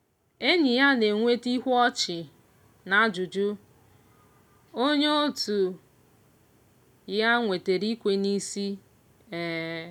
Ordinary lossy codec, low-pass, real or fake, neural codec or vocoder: none; 19.8 kHz; real; none